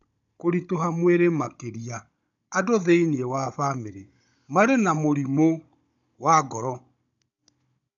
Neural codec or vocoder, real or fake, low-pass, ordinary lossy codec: codec, 16 kHz, 16 kbps, FunCodec, trained on Chinese and English, 50 frames a second; fake; 7.2 kHz; none